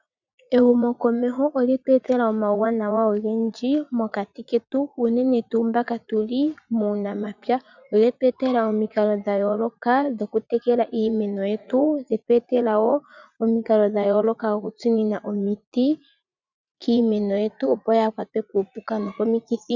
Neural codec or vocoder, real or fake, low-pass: vocoder, 44.1 kHz, 80 mel bands, Vocos; fake; 7.2 kHz